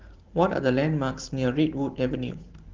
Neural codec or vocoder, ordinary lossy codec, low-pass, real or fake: vocoder, 44.1 kHz, 128 mel bands every 512 samples, BigVGAN v2; Opus, 16 kbps; 7.2 kHz; fake